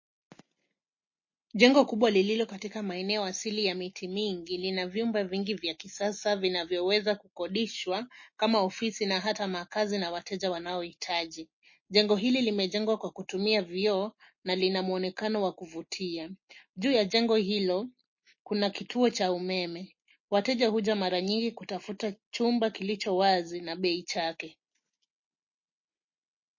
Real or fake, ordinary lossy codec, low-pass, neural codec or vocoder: real; MP3, 32 kbps; 7.2 kHz; none